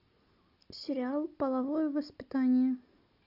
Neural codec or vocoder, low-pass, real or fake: none; 5.4 kHz; real